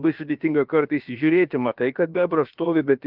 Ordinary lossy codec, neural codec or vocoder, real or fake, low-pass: Opus, 24 kbps; codec, 16 kHz, about 1 kbps, DyCAST, with the encoder's durations; fake; 5.4 kHz